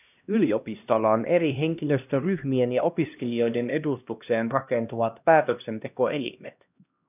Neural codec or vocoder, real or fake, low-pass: codec, 16 kHz, 1 kbps, X-Codec, HuBERT features, trained on LibriSpeech; fake; 3.6 kHz